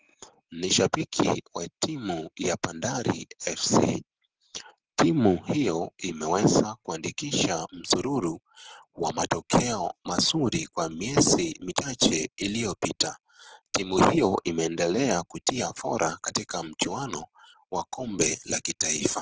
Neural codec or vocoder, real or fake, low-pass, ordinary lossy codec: none; real; 7.2 kHz; Opus, 16 kbps